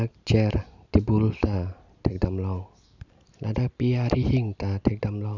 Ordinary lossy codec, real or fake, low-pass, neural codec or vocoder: none; real; 7.2 kHz; none